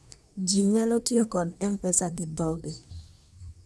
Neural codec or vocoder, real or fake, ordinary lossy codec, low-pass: codec, 24 kHz, 1 kbps, SNAC; fake; none; none